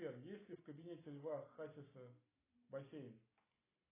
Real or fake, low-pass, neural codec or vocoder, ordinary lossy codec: real; 3.6 kHz; none; AAC, 16 kbps